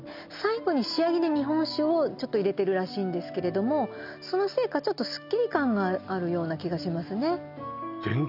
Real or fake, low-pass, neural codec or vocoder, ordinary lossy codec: real; 5.4 kHz; none; none